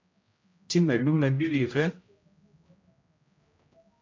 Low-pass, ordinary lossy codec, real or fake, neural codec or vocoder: 7.2 kHz; MP3, 64 kbps; fake; codec, 16 kHz, 0.5 kbps, X-Codec, HuBERT features, trained on general audio